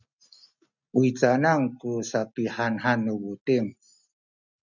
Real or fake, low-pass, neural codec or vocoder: real; 7.2 kHz; none